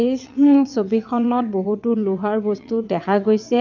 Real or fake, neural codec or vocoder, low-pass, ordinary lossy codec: fake; vocoder, 22.05 kHz, 80 mel bands, WaveNeXt; 7.2 kHz; none